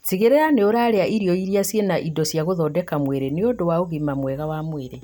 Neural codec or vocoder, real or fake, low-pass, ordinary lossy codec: none; real; none; none